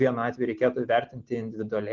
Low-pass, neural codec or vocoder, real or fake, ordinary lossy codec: 7.2 kHz; none; real; Opus, 16 kbps